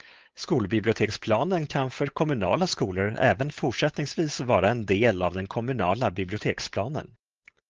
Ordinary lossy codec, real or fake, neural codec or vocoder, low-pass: Opus, 24 kbps; fake; codec, 16 kHz, 8 kbps, FunCodec, trained on Chinese and English, 25 frames a second; 7.2 kHz